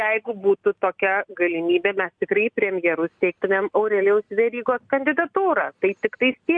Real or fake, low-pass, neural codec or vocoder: real; 9.9 kHz; none